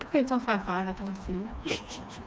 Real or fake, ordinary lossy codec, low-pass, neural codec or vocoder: fake; none; none; codec, 16 kHz, 2 kbps, FreqCodec, smaller model